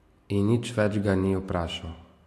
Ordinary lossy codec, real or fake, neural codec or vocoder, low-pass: AAC, 64 kbps; real; none; 14.4 kHz